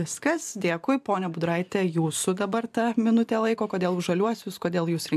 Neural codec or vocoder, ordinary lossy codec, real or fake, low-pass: vocoder, 44.1 kHz, 128 mel bands every 512 samples, BigVGAN v2; AAC, 96 kbps; fake; 14.4 kHz